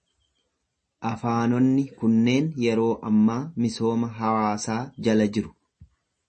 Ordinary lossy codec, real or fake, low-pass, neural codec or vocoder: MP3, 32 kbps; real; 10.8 kHz; none